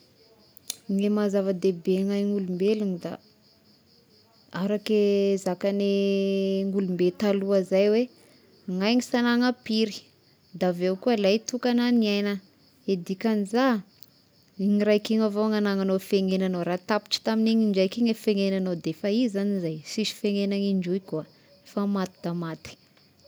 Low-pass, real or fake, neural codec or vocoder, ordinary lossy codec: none; real; none; none